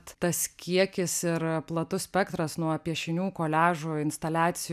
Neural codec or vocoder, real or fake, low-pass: none; real; 14.4 kHz